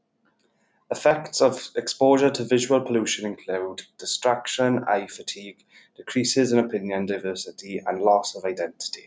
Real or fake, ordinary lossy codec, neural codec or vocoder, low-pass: real; none; none; none